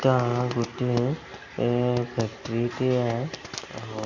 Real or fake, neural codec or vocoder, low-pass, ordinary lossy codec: real; none; 7.2 kHz; none